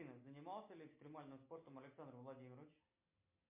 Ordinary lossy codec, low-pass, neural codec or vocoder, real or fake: MP3, 32 kbps; 3.6 kHz; none; real